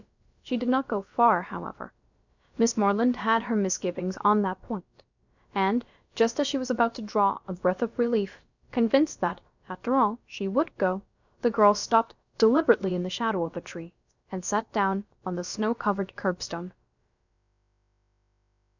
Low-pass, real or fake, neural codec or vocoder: 7.2 kHz; fake; codec, 16 kHz, about 1 kbps, DyCAST, with the encoder's durations